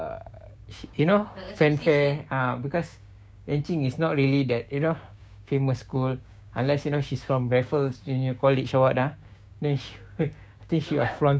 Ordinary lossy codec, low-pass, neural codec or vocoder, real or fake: none; none; codec, 16 kHz, 6 kbps, DAC; fake